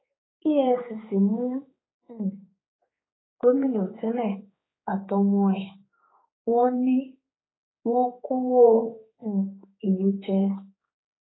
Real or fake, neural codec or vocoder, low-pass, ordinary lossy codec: fake; codec, 16 kHz, 4 kbps, X-Codec, HuBERT features, trained on general audio; 7.2 kHz; AAC, 16 kbps